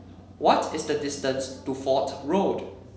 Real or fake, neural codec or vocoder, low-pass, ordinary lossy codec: real; none; none; none